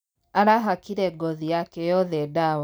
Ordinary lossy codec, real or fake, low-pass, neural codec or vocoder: none; real; none; none